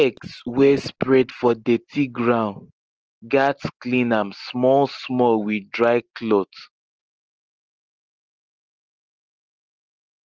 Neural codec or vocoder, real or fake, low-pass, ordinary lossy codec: none; real; 7.2 kHz; Opus, 16 kbps